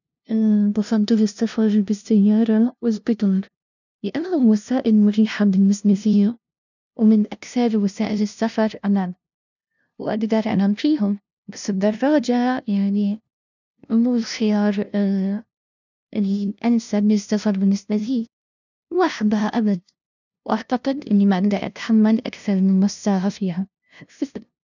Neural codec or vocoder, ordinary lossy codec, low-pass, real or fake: codec, 16 kHz, 0.5 kbps, FunCodec, trained on LibriTTS, 25 frames a second; none; 7.2 kHz; fake